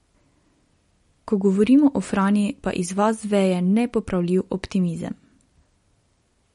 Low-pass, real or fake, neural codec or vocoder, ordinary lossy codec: 19.8 kHz; real; none; MP3, 48 kbps